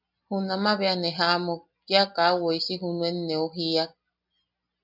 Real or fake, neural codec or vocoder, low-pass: real; none; 5.4 kHz